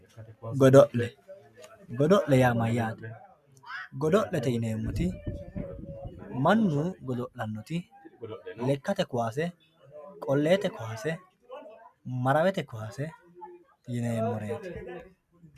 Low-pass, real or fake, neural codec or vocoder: 14.4 kHz; real; none